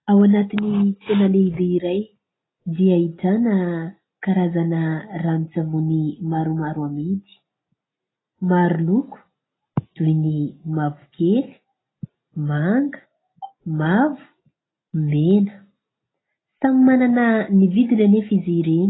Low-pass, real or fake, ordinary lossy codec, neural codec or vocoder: 7.2 kHz; real; AAC, 16 kbps; none